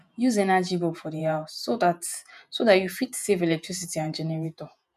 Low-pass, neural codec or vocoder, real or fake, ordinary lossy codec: 14.4 kHz; vocoder, 48 kHz, 128 mel bands, Vocos; fake; none